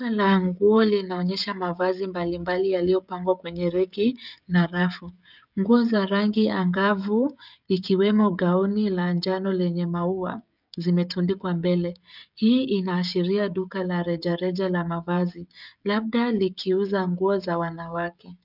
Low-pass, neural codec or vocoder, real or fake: 5.4 kHz; codec, 16 kHz, 16 kbps, FreqCodec, smaller model; fake